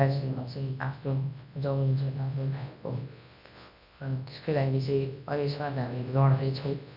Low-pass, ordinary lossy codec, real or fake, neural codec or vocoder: 5.4 kHz; MP3, 48 kbps; fake; codec, 24 kHz, 0.9 kbps, WavTokenizer, large speech release